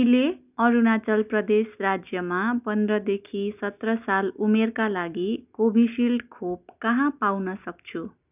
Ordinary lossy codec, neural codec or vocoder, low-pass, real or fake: none; none; 3.6 kHz; real